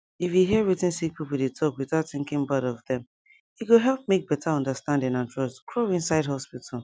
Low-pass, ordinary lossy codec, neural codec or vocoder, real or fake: none; none; none; real